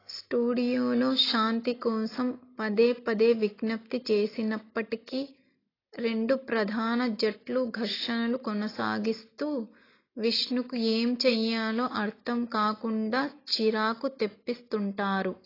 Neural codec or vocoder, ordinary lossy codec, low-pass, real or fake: none; AAC, 24 kbps; 5.4 kHz; real